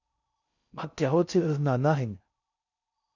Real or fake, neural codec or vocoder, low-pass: fake; codec, 16 kHz in and 24 kHz out, 0.6 kbps, FocalCodec, streaming, 4096 codes; 7.2 kHz